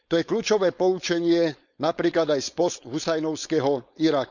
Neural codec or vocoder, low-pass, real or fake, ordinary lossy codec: codec, 16 kHz, 4.8 kbps, FACodec; 7.2 kHz; fake; none